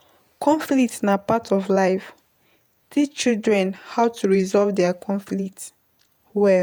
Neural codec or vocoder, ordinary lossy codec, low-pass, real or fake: vocoder, 48 kHz, 128 mel bands, Vocos; none; none; fake